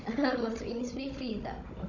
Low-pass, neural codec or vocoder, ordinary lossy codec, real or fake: 7.2 kHz; codec, 16 kHz, 16 kbps, FunCodec, trained on Chinese and English, 50 frames a second; none; fake